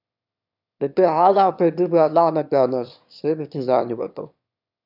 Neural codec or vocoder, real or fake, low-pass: autoencoder, 22.05 kHz, a latent of 192 numbers a frame, VITS, trained on one speaker; fake; 5.4 kHz